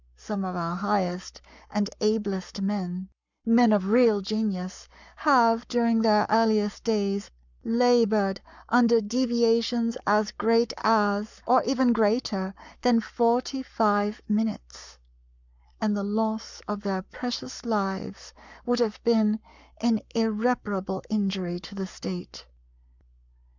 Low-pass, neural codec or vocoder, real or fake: 7.2 kHz; codec, 44.1 kHz, 7.8 kbps, Pupu-Codec; fake